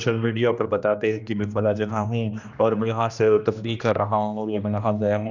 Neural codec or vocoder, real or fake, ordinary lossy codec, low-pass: codec, 16 kHz, 1 kbps, X-Codec, HuBERT features, trained on general audio; fake; none; 7.2 kHz